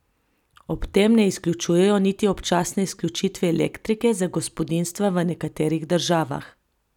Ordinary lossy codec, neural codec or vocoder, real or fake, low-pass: none; none; real; 19.8 kHz